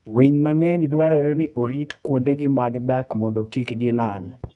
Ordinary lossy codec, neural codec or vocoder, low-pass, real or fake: none; codec, 24 kHz, 0.9 kbps, WavTokenizer, medium music audio release; 10.8 kHz; fake